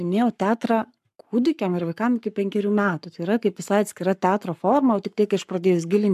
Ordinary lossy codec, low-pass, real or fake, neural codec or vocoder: MP3, 96 kbps; 14.4 kHz; fake; codec, 44.1 kHz, 7.8 kbps, Pupu-Codec